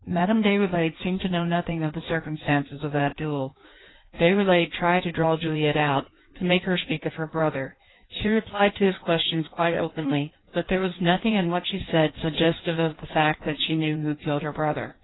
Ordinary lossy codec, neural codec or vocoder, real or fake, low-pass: AAC, 16 kbps; codec, 16 kHz in and 24 kHz out, 1.1 kbps, FireRedTTS-2 codec; fake; 7.2 kHz